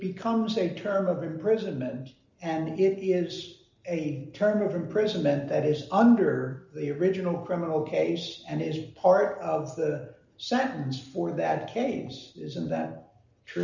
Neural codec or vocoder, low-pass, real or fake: none; 7.2 kHz; real